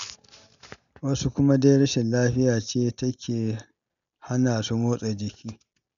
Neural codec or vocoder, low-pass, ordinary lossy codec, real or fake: none; 7.2 kHz; none; real